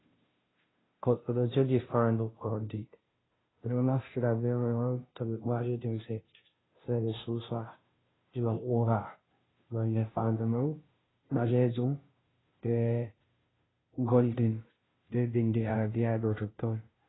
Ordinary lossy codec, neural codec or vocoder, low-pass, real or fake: AAC, 16 kbps; codec, 16 kHz, 0.5 kbps, FunCodec, trained on Chinese and English, 25 frames a second; 7.2 kHz; fake